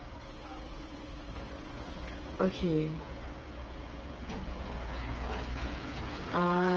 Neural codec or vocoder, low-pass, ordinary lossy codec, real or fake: codec, 16 kHz, 8 kbps, FreqCodec, smaller model; 7.2 kHz; Opus, 24 kbps; fake